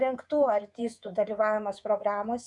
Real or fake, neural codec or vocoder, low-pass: fake; codec, 24 kHz, 3.1 kbps, DualCodec; 10.8 kHz